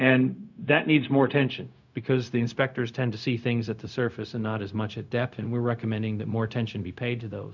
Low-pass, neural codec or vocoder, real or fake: 7.2 kHz; codec, 16 kHz, 0.4 kbps, LongCat-Audio-Codec; fake